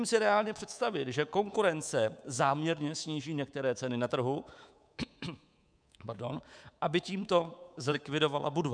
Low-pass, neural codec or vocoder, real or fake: 9.9 kHz; codec, 24 kHz, 3.1 kbps, DualCodec; fake